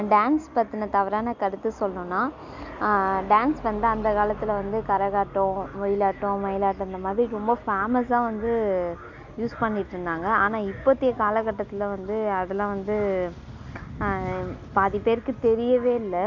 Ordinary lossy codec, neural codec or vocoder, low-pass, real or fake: MP3, 64 kbps; none; 7.2 kHz; real